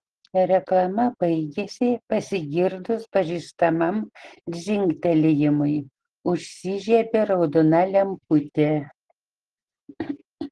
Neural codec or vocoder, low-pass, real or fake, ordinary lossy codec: none; 10.8 kHz; real; Opus, 16 kbps